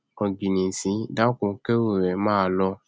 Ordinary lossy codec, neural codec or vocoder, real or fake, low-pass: none; none; real; none